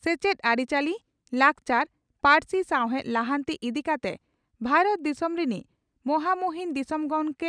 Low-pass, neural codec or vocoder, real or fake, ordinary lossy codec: 9.9 kHz; none; real; none